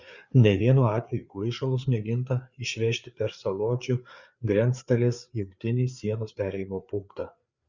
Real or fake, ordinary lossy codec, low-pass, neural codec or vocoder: fake; Opus, 64 kbps; 7.2 kHz; codec, 16 kHz, 4 kbps, FreqCodec, larger model